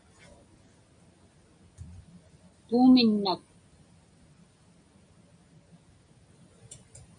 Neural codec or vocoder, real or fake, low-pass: none; real; 9.9 kHz